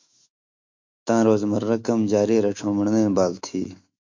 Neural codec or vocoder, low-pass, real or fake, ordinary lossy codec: autoencoder, 48 kHz, 128 numbers a frame, DAC-VAE, trained on Japanese speech; 7.2 kHz; fake; MP3, 48 kbps